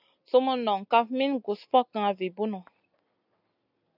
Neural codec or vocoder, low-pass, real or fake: none; 5.4 kHz; real